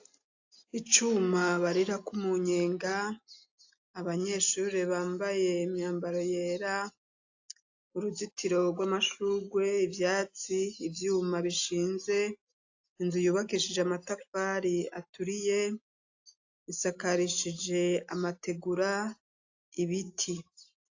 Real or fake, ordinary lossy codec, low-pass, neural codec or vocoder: real; AAC, 48 kbps; 7.2 kHz; none